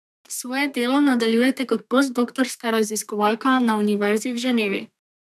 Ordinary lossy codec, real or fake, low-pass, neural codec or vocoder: none; fake; 14.4 kHz; codec, 32 kHz, 1.9 kbps, SNAC